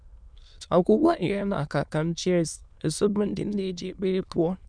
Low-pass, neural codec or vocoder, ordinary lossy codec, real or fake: 9.9 kHz; autoencoder, 22.05 kHz, a latent of 192 numbers a frame, VITS, trained on many speakers; none; fake